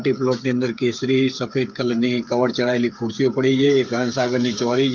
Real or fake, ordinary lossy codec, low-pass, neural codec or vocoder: fake; Opus, 32 kbps; 7.2 kHz; codec, 16 kHz, 8 kbps, FreqCodec, smaller model